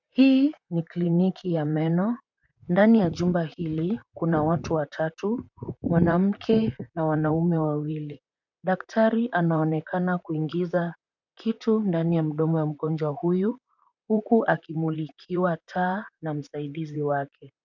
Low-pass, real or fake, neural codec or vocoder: 7.2 kHz; fake; vocoder, 44.1 kHz, 128 mel bands, Pupu-Vocoder